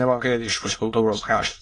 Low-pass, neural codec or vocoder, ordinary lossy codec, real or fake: 9.9 kHz; autoencoder, 22.05 kHz, a latent of 192 numbers a frame, VITS, trained on many speakers; AAC, 32 kbps; fake